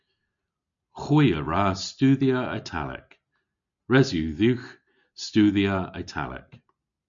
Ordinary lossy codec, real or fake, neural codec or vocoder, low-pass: MP3, 64 kbps; real; none; 7.2 kHz